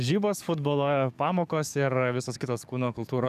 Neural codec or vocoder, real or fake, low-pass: codec, 44.1 kHz, 7.8 kbps, DAC; fake; 14.4 kHz